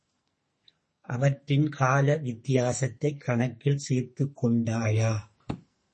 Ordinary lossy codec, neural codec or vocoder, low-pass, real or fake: MP3, 32 kbps; codec, 44.1 kHz, 2.6 kbps, SNAC; 10.8 kHz; fake